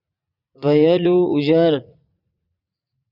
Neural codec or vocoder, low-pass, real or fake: vocoder, 44.1 kHz, 80 mel bands, Vocos; 5.4 kHz; fake